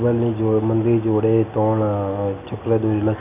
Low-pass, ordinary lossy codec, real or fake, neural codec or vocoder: 3.6 kHz; none; real; none